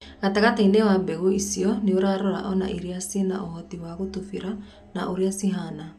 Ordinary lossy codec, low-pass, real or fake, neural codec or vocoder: none; 14.4 kHz; real; none